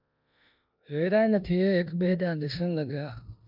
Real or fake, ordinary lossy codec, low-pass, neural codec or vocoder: fake; MP3, 48 kbps; 5.4 kHz; codec, 16 kHz in and 24 kHz out, 0.9 kbps, LongCat-Audio-Codec, four codebook decoder